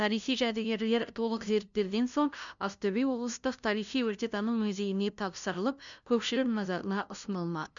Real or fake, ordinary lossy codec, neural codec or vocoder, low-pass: fake; none; codec, 16 kHz, 0.5 kbps, FunCodec, trained on LibriTTS, 25 frames a second; 7.2 kHz